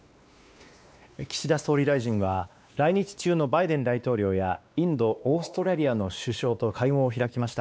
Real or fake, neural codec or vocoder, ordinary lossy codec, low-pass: fake; codec, 16 kHz, 2 kbps, X-Codec, WavLM features, trained on Multilingual LibriSpeech; none; none